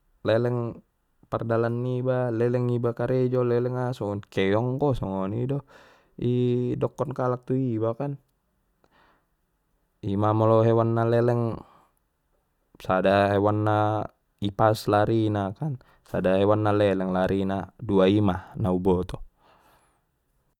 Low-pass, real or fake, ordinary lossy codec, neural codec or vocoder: 19.8 kHz; real; none; none